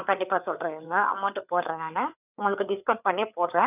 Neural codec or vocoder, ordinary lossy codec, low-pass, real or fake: codec, 16 kHz, 4 kbps, FreqCodec, larger model; none; 3.6 kHz; fake